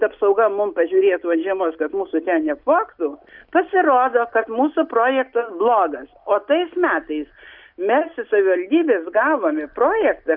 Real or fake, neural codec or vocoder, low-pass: real; none; 5.4 kHz